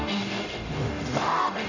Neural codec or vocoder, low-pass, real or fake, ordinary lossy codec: codec, 44.1 kHz, 0.9 kbps, DAC; 7.2 kHz; fake; none